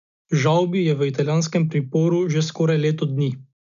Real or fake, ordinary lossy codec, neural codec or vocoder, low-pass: real; none; none; 7.2 kHz